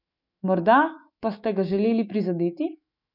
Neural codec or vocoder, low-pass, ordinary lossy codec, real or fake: autoencoder, 48 kHz, 128 numbers a frame, DAC-VAE, trained on Japanese speech; 5.4 kHz; none; fake